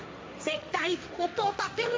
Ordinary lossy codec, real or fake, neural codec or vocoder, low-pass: none; fake; codec, 16 kHz, 1.1 kbps, Voila-Tokenizer; none